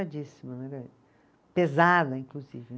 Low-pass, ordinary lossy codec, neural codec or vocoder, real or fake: none; none; none; real